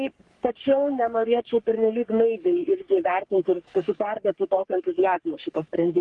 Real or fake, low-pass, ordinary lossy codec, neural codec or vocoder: fake; 10.8 kHz; Opus, 24 kbps; codec, 44.1 kHz, 3.4 kbps, Pupu-Codec